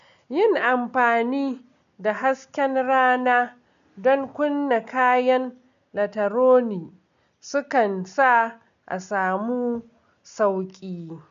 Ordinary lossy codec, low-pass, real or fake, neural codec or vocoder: none; 7.2 kHz; real; none